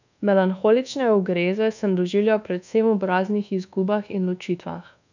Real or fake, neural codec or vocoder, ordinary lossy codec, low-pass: fake; codec, 24 kHz, 1.2 kbps, DualCodec; none; 7.2 kHz